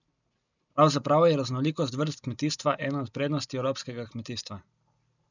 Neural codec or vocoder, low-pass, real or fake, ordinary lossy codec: none; 7.2 kHz; real; none